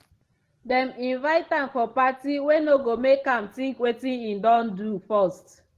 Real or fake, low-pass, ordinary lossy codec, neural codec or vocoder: real; 14.4 kHz; Opus, 16 kbps; none